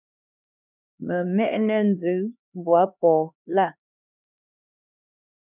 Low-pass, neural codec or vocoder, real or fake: 3.6 kHz; codec, 16 kHz, 2 kbps, X-Codec, HuBERT features, trained on LibriSpeech; fake